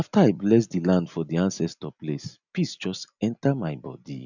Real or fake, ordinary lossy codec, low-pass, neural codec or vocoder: real; none; 7.2 kHz; none